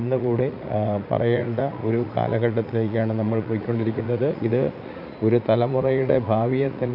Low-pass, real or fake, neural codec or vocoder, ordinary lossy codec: 5.4 kHz; fake; vocoder, 44.1 kHz, 80 mel bands, Vocos; none